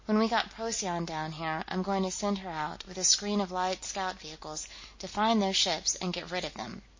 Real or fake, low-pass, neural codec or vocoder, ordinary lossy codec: real; 7.2 kHz; none; MP3, 32 kbps